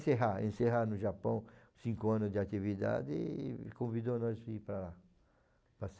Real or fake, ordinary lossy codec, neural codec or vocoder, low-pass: real; none; none; none